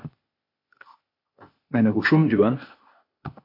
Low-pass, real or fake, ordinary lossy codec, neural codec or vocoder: 5.4 kHz; fake; MP3, 32 kbps; codec, 16 kHz, 0.8 kbps, ZipCodec